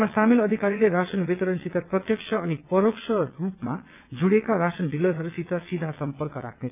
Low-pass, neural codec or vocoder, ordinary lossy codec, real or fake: 3.6 kHz; vocoder, 22.05 kHz, 80 mel bands, WaveNeXt; none; fake